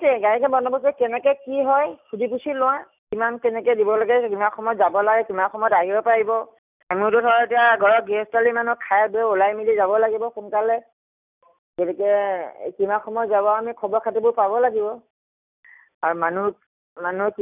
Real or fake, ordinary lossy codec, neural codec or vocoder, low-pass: real; none; none; 3.6 kHz